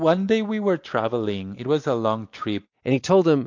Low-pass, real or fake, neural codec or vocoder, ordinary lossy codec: 7.2 kHz; real; none; MP3, 48 kbps